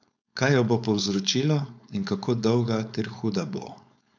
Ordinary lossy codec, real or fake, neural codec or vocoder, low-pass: none; fake; codec, 16 kHz, 4.8 kbps, FACodec; 7.2 kHz